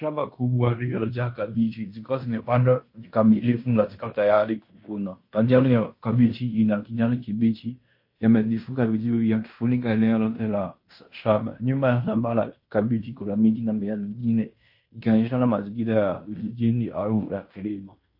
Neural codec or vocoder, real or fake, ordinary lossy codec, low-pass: codec, 16 kHz in and 24 kHz out, 0.9 kbps, LongCat-Audio-Codec, fine tuned four codebook decoder; fake; MP3, 32 kbps; 5.4 kHz